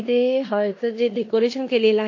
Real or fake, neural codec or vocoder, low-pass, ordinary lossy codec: fake; codec, 16 kHz in and 24 kHz out, 0.9 kbps, LongCat-Audio-Codec, four codebook decoder; 7.2 kHz; AAC, 48 kbps